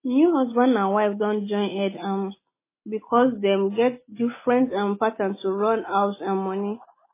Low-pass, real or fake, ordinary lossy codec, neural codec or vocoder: 3.6 kHz; real; MP3, 16 kbps; none